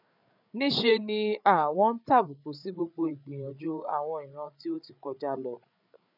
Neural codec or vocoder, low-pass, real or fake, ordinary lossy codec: codec, 16 kHz, 8 kbps, FreqCodec, larger model; 5.4 kHz; fake; none